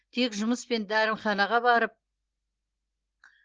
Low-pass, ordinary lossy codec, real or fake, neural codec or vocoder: 7.2 kHz; Opus, 16 kbps; real; none